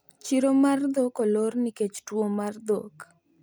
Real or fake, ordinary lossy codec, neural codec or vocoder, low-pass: real; none; none; none